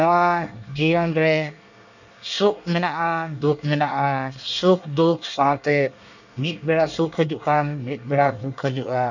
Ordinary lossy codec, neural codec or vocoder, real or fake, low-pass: none; codec, 24 kHz, 1 kbps, SNAC; fake; 7.2 kHz